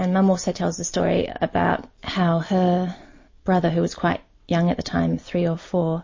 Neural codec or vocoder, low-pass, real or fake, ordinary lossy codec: none; 7.2 kHz; real; MP3, 32 kbps